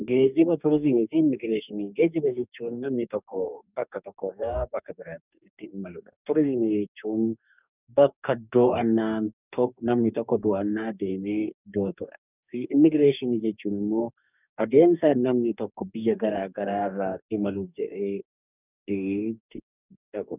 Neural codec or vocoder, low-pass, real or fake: codec, 44.1 kHz, 2.6 kbps, DAC; 3.6 kHz; fake